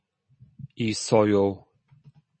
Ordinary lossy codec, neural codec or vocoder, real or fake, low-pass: MP3, 32 kbps; none; real; 9.9 kHz